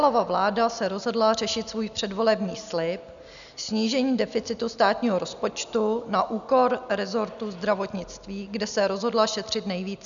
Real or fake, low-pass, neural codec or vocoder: real; 7.2 kHz; none